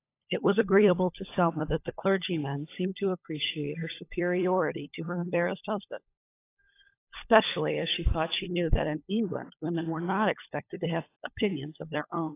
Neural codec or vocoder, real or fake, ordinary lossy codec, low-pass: codec, 16 kHz, 16 kbps, FunCodec, trained on LibriTTS, 50 frames a second; fake; AAC, 24 kbps; 3.6 kHz